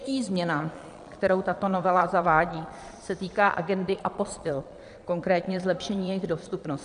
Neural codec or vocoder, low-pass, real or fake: vocoder, 22.05 kHz, 80 mel bands, Vocos; 9.9 kHz; fake